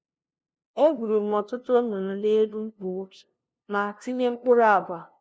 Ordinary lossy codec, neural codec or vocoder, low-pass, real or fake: none; codec, 16 kHz, 0.5 kbps, FunCodec, trained on LibriTTS, 25 frames a second; none; fake